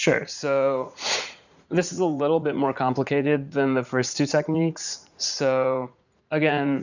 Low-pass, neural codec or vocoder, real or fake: 7.2 kHz; vocoder, 44.1 kHz, 80 mel bands, Vocos; fake